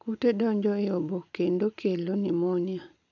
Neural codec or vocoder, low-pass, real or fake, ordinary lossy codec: none; 7.2 kHz; real; none